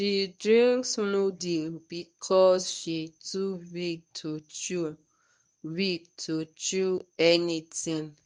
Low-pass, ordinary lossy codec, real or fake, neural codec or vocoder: 10.8 kHz; none; fake; codec, 24 kHz, 0.9 kbps, WavTokenizer, medium speech release version 1